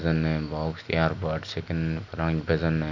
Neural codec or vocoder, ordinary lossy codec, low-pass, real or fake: none; none; 7.2 kHz; real